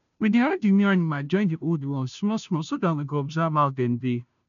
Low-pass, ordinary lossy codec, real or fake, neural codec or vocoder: 7.2 kHz; none; fake; codec, 16 kHz, 0.5 kbps, FunCodec, trained on Chinese and English, 25 frames a second